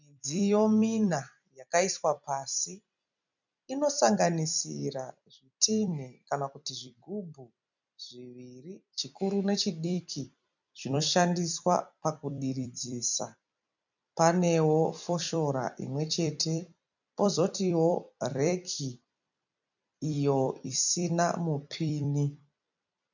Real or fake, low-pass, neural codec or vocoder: fake; 7.2 kHz; vocoder, 44.1 kHz, 128 mel bands every 256 samples, BigVGAN v2